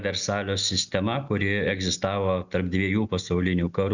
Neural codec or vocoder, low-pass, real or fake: none; 7.2 kHz; real